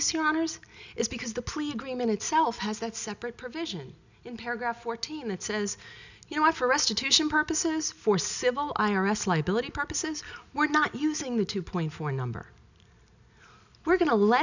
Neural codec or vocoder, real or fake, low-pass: none; real; 7.2 kHz